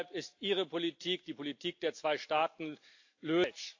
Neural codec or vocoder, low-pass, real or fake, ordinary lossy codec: none; 7.2 kHz; real; AAC, 48 kbps